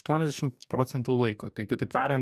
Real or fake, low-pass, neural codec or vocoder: fake; 14.4 kHz; codec, 44.1 kHz, 2.6 kbps, DAC